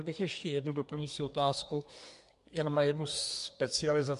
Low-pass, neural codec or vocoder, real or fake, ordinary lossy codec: 10.8 kHz; codec, 24 kHz, 1 kbps, SNAC; fake; MP3, 64 kbps